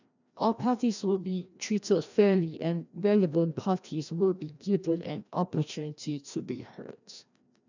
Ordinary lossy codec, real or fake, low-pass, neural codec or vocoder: none; fake; 7.2 kHz; codec, 16 kHz, 1 kbps, FreqCodec, larger model